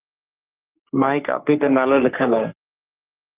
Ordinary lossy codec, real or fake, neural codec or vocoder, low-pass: Opus, 32 kbps; fake; codec, 44.1 kHz, 3.4 kbps, Pupu-Codec; 3.6 kHz